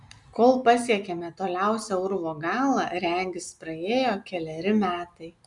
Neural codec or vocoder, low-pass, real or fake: none; 10.8 kHz; real